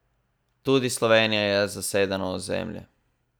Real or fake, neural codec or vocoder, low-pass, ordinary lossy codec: real; none; none; none